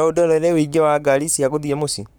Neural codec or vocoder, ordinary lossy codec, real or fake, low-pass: codec, 44.1 kHz, 7.8 kbps, Pupu-Codec; none; fake; none